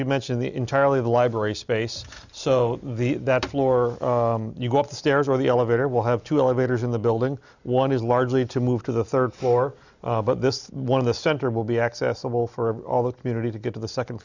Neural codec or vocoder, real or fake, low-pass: none; real; 7.2 kHz